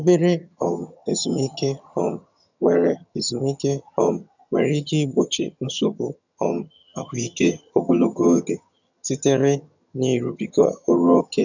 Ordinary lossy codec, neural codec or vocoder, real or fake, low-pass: none; vocoder, 22.05 kHz, 80 mel bands, HiFi-GAN; fake; 7.2 kHz